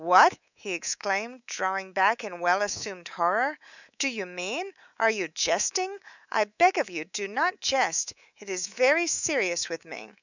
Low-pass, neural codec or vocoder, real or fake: 7.2 kHz; codec, 24 kHz, 3.1 kbps, DualCodec; fake